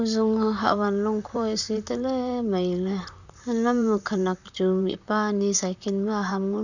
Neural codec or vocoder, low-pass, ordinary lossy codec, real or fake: vocoder, 44.1 kHz, 128 mel bands, Pupu-Vocoder; 7.2 kHz; none; fake